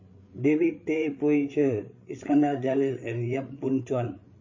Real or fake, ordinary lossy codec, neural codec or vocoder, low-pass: fake; MP3, 32 kbps; codec, 16 kHz, 8 kbps, FreqCodec, larger model; 7.2 kHz